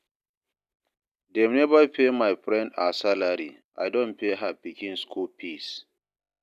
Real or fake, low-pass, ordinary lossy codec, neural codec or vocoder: real; 14.4 kHz; none; none